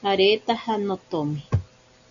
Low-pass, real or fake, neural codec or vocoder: 7.2 kHz; real; none